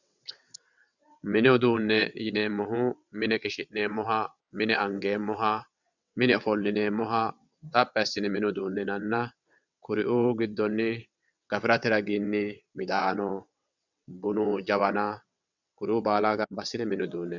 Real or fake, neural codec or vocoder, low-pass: fake; vocoder, 22.05 kHz, 80 mel bands, WaveNeXt; 7.2 kHz